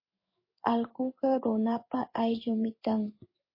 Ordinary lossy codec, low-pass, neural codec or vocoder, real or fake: MP3, 24 kbps; 5.4 kHz; codec, 16 kHz in and 24 kHz out, 1 kbps, XY-Tokenizer; fake